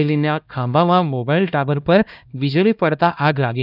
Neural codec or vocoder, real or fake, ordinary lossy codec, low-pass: codec, 16 kHz, 1 kbps, X-Codec, HuBERT features, trained on LibriSpeech; fake; none; 5.4 kHz